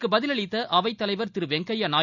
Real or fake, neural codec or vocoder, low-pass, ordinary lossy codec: real; none; 7.2 kHz; none